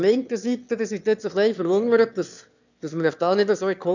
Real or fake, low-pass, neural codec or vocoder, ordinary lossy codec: fake; 7.2 kHz; autoencoder, 22.05 kHz, a latent of 192 numbers a frame, VITS, trained on one speaker; none